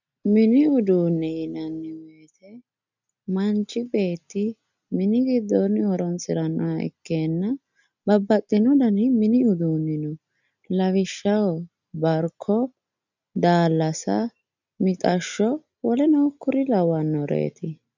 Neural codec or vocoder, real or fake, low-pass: none; real; 7.2 kHz